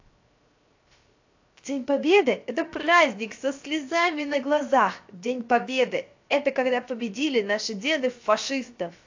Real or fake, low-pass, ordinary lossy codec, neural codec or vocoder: fake; 7.2 kHz; AAC, 48 kbps; codec, 16 kHz, 0.7 kbps, FocalCodec